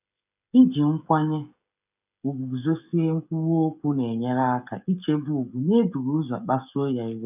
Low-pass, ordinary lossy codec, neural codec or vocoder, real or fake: 3.6 kHz; none; codec, 16 kHz, 16 kbps, FreqCodec, smaller model; fake